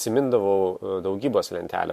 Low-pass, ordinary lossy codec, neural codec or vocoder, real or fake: 14.4 kHz; MP3, 96 kbps; none; real